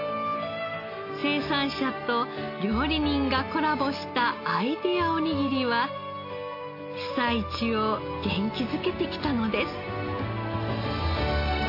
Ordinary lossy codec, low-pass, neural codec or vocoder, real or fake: AAC, 32 kbps; 5.4 kHz; none; real